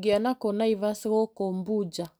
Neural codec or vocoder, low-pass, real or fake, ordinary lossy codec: none; none; real; none